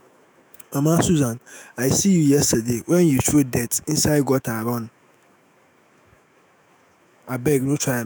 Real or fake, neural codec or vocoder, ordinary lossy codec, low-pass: fake; autoencoder, 48 kHz, 128 numbers a frame, DAC-VAE, trained on Japanese speech; none; none